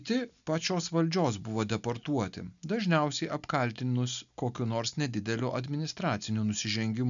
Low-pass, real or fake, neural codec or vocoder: 7.2 kHz; real; none